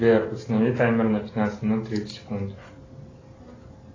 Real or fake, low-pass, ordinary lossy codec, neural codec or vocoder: real; 7.2 kHz; AAC, 32 kbps; none